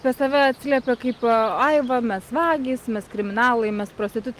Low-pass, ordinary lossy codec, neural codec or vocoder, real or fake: 14.4 kHz; Opus, 32 kbps; none; real